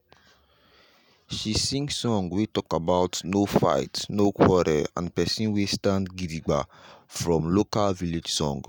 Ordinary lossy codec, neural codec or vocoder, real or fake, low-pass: none; none; real; none